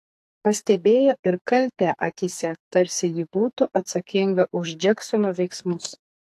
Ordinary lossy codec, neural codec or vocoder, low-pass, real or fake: AAC, 64 kbps; codec, 44.1 kHz, 2.6 kbps, SNAC; 14.4 kHz; fake